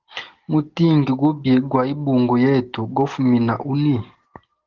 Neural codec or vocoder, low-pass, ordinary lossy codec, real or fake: none; 7.2 kHz; Opus, 16 kbps; real